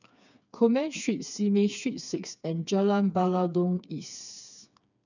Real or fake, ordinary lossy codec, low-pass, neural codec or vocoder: fake; none; 7.2 kHz; codec, 16 kHz, 4 kbps, FreqCodec, smaller model